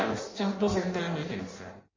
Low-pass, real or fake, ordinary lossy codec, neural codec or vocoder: 7.2 kHz; fake; MP3, 32 kbps; codec, 16 kHz in and 24 kHz out, 0.6 kbps, FireRedTTS-2 codec